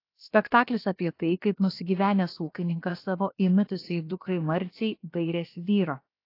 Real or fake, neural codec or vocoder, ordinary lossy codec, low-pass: fake; codec, 16 kHz, about 1 kbps, DyCAST, with the encoder's durations; AAC, 32 kbps; 5.4 kHz